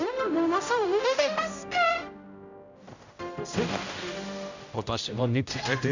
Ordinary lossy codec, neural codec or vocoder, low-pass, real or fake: none; codec, 16 kHz, 0.5 kbps, X-Codec, HuBERT features, trained on general audio; 7.2 kHz; fake